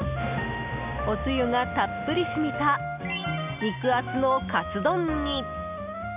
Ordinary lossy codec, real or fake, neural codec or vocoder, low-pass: none; real; none; 3.6 kHz